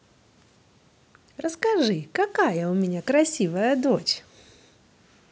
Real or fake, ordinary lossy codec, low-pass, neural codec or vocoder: real; none; none; none